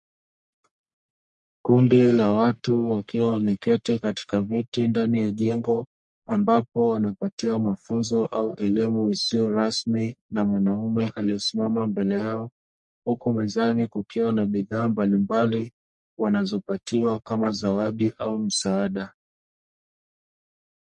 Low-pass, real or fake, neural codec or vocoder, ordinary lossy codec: 10.8 kHz; fake; codec, 44.1 kHz, 1.7 kbps, Pupu-Codec; MP3, 48 kbps